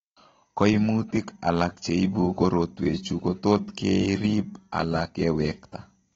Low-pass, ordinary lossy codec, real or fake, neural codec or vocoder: 19.8 kHz; AAC, 24 kbps; real; none